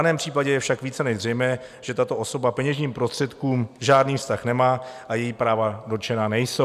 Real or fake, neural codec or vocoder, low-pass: real; none; 14.4 kHz